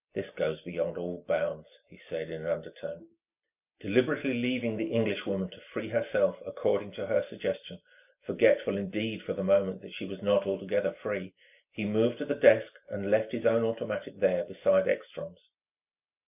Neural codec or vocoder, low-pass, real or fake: none; 3.6 kHz; real